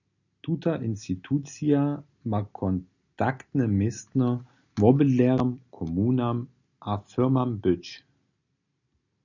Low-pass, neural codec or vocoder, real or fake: 7.2 kHz; none; real